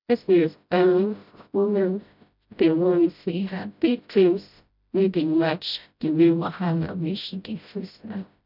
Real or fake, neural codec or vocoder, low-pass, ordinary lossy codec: fake; codec, 16 kHz, 0.5 kbps, FreqCodec, smaller model; 5.4 kHz; none